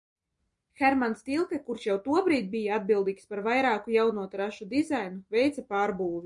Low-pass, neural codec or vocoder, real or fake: 10.8 kHz; none; real